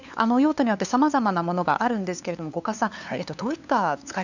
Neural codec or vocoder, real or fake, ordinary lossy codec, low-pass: codec, 16 kHz, 2 kbps, FunCodec, trained on LibriTTS, 25 frames a second; fake; none; 7.2 kHz